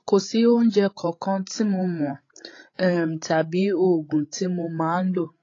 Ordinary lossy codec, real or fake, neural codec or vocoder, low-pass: AAC, 32 kbps; fake; codec, 16 kHz, 8 kbps, FreqCodec, larger model; 7.2 kHz